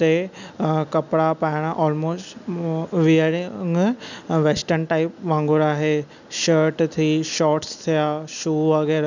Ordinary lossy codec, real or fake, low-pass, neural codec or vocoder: none; real; 7.2 kHz; none